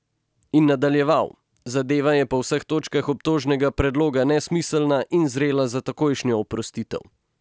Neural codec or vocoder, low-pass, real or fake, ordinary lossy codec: none; none; real; none